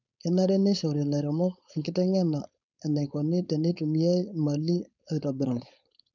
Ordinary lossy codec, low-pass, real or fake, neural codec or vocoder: none; 7.2 kHz; fake; codec, 16 kHz, 4.8 kbps, FACodec